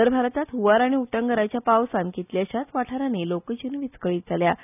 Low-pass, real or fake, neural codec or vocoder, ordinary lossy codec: 3.6 kHz; real; none; none